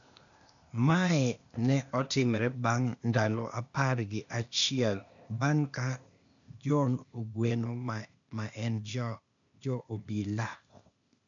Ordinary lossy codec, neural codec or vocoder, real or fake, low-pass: none; codec, 16 kHz, 0.8 kbps, ZipCodec; fake; 7.2 kHz